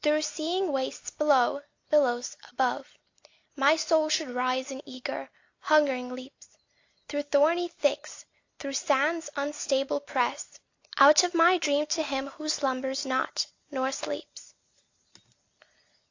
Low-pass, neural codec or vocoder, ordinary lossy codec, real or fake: 7.2 kHz; none; AAC, 48 kbps; real